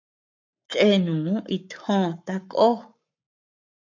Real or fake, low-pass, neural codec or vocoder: fake; 7.2 kHz; codec, 44.1 kHz, 7.8 kbps, Pupu-Codec